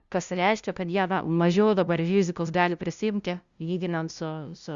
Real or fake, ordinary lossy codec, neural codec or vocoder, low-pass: fake; Opus, 64 kbps; codec, 16 kHz, 0.5 kbps, FunCodec, trained on LibriTTS, 25 frames a second; 7.2 kHz